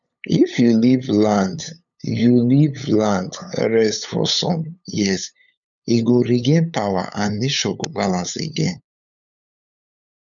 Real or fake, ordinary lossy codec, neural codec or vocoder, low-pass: fake; none; codec, 16 kHz, 8 kbps, FunCodec, trained on LibriTTS, 25 frames a second; 7.2 kHz